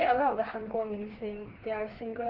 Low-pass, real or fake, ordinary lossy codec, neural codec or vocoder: 5.4 kHz; fake; Opus, 16 kbps; codec, 16 kHz, 2 kbps, FreqCodec, larger model